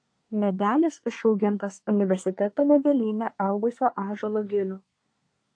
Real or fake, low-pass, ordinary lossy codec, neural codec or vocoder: fake; 9.9 kHz; AAC, 48 kbps; codec, 24 kHz, 1 kbps, SNAC